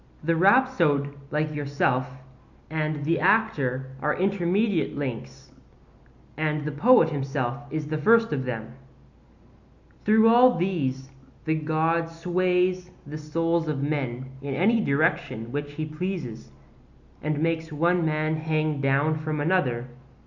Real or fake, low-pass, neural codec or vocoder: real; 7.2 kHz; none